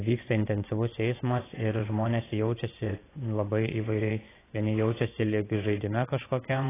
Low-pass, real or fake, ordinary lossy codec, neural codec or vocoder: 3.6 kHz; fake; AAC, 16 kbps; vocoder, 24 kHz, 100 mel bands, Vocos